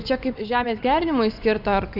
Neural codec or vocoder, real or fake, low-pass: vocoder, 24 kHz, 100 mel bands, Vocos; fake; 5.4 kHz